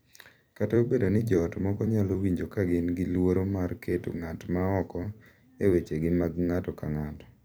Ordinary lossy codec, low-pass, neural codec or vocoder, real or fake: none; none; vocoder, 44.1 kHz, 128 mel bands every 256 samples, BigVGAN v2; fake